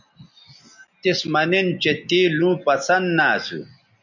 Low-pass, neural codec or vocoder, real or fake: 7.2 kHz; none; real